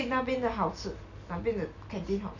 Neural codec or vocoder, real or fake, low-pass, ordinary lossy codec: none; real; 7.2 kHz; none